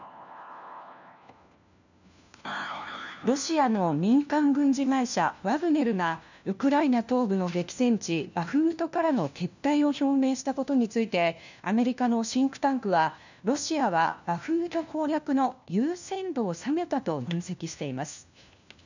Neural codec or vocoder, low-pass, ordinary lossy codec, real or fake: codec, 16 kHz, 1 kbps, FunCodec, trained on LibriTTS, 50 frames a second; 7.2 kHz; none; fake